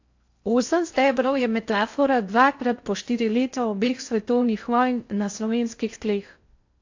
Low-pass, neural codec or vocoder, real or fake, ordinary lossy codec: 7.2 kHz; codec, 16 kHz in and 24 kHz out, 0.6 kbps, FocalCodec, streaming, 4096 codes; fake; AAC, 48 kbps